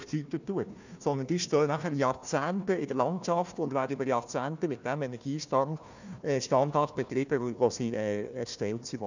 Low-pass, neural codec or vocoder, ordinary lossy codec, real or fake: 7.2 kHz; codec, 16 kHz, 1 kbps, FunCodec, trained on Chinese and English, 50 frames a second; none; fake